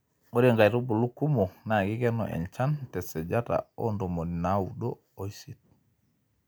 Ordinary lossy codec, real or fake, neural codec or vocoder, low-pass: none; real; none; none